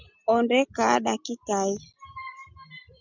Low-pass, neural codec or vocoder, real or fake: 7.2 kHz; none; real